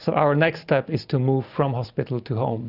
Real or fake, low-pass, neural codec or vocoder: real; 5.4 kHz; none